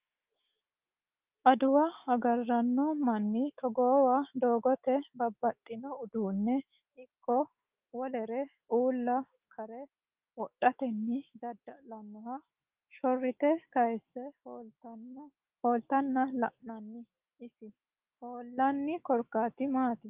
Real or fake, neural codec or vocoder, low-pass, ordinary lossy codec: fake; autoencoder, 48 kHz, 128 numbers a frame, DAC-VAE, trained on Japanese speech; 3.6 kHz; Opus, 24 kbps